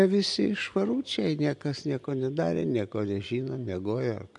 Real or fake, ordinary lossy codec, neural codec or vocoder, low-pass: real; MP3, 64 kbps; none; 10.8 kHz